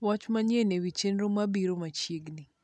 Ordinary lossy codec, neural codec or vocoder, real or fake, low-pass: none; none; real; none